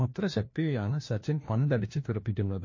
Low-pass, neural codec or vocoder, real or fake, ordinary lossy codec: 7.2 kHz; codec, 16 kHz, 1 kbps, FunCodec, trained on LibriTTS, 50 frames a second; fake; MP3, 32 kbps